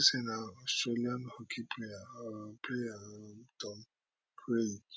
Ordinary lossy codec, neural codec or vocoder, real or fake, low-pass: none; none; real; none